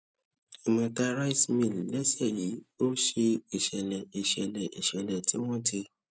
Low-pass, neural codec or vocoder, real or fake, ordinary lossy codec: none; none; real; none